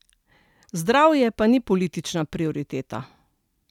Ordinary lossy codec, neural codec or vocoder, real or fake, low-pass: none; none; real; 19.8 kHz